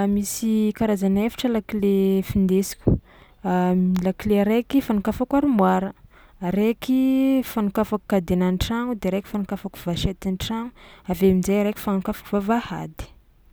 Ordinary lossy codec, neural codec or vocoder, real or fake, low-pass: none; none; real; none